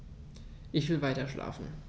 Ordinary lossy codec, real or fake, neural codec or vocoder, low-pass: none; real; none; none